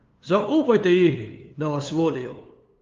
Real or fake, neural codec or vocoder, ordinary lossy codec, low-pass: fake; codec, 16 kHz, 2 kbps, FunCodec, trained on LibriTTS, 25 frames a second; Opus, 32 kbps; 7.2 kHz